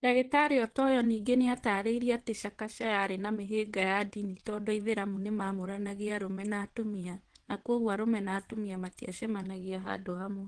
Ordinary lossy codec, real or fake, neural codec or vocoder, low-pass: Opus, 16 kbps; fake; vocoder, 22.05 kHz, 80 mel bands, WaveNeXt; 9.9 kHz